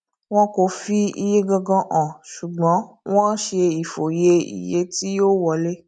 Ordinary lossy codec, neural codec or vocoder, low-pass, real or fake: none; none; 9.9 kHz; real